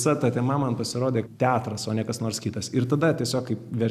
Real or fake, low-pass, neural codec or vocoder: real; 14.4 kHz; none